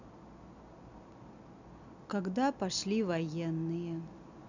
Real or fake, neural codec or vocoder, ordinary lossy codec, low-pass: real; none; none; 7.2 kHz